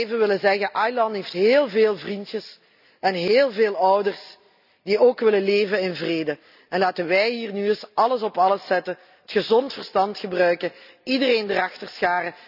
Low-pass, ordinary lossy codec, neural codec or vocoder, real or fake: 5.4 kHz; none; none; real